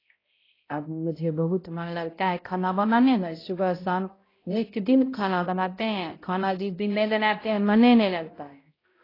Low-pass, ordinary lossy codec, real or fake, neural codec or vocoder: 5.4 kHz; AAC, 24 kbps; fake; codec, 16 kHz, 0.5 kbps, X-Codec, HuBERT features, trained on balanced general audio